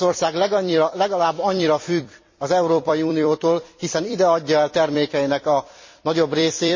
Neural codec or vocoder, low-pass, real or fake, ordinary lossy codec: vocoder, 44.1 kHz, 128 mel bands every 256 samples, BigVGAN v2; 7.2 kHz; fake; MP3, 32 kbps